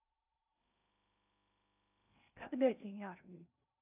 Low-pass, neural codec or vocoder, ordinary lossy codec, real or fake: 3.6 kHz; codec, 16 kHz in and 24 kHz out, 0.8 kbps, FocalCodec, streaming, 65536 codes; none; fake